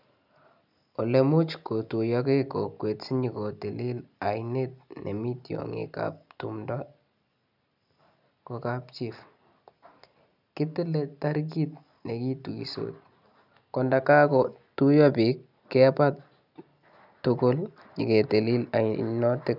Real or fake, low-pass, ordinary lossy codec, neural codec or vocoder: real; 5.4 kHz; none; none